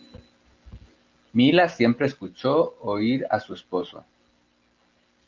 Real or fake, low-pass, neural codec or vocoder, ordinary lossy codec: real; 7.2 kHz; none; Opus, 32 kbps